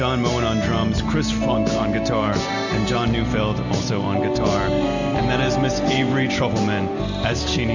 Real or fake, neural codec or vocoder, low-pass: real; none; 7.2 kHz